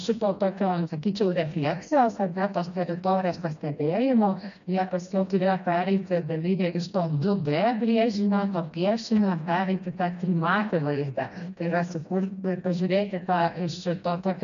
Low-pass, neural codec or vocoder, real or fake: 7.2 kHz; codec, 16 kHz, 1 kbps, FreqCodec, smaller model; fake